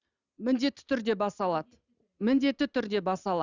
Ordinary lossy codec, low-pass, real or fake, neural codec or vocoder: Opus, 64 kbps; 7.2 kHz; real; none